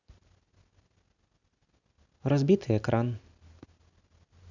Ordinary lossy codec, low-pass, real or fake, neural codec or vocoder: none; 7.2 kHz; real; none